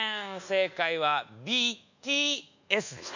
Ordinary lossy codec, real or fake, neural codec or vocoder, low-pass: none; fake; codec, 24 kHz, 1.2 kbps, DualCodec; 7.2 kHz